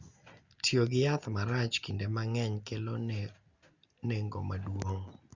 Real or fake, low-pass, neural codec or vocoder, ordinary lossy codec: real; 7.2 kHz; none; Opus, 64 kbps